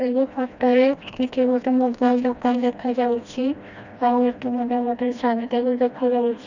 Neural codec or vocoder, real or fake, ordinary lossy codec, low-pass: codec, 16 kHz, 1 kbps, FreqCodec, smaller model; fake; none; 7.2 kHz